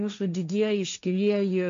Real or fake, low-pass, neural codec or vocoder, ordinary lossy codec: fake; 7.2 kHz; codec, 16 kHz, 1.1 kbps, Voila-Tokenizer; MP3, 64 kbps